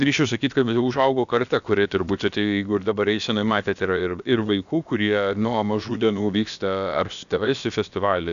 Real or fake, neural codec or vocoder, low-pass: fake; codec, 16 kHz, about 1 kbps, DyCAST, with the encoder's durations; 7.2 kHz